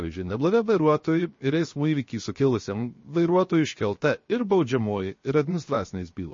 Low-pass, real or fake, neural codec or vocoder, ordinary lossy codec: 7.2 kHz; fake; codec, 16 kHz, 0.7 kbps, FocalCodec; MP3, 32 kbps